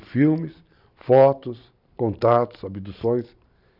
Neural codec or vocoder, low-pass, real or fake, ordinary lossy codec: vocoder, 44.1 kHz, 128 mel bands every 512 samples, BigVGAN v2; 5.4 kHz; fake; none